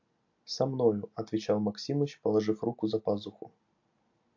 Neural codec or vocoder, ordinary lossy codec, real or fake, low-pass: none; AAC, 48 kbps; real; 7.2 kHz